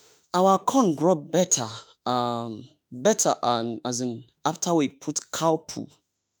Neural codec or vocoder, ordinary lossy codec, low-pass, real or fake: autoencoder, 48 kHz, 32 numbers a frame, DAC-VAE, trained on Japanese speech; none; none; fake